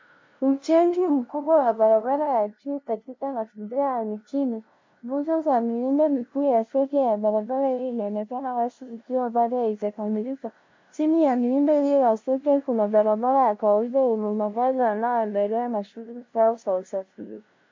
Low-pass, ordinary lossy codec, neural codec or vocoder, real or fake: 7.2 kHz; MP3, 48 kbps; codec, 16 kHz, 0.5 kbps, FunCodec, trained on LibriTTS, 25 frames a second; fake